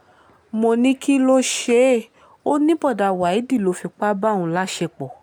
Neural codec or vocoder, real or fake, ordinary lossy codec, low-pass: none; real; none; 19.8 kHz